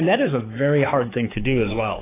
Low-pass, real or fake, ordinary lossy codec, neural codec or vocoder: 3.6 kHz; fake; AAC, 16 kbps; codec, 16 kHz, 4 kbps, X-Codec, HuBERT features, trained on balanced general audio